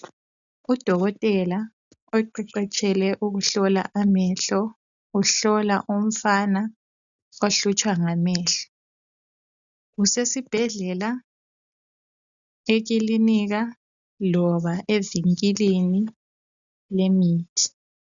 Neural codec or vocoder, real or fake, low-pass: none; real; 7.2 kHz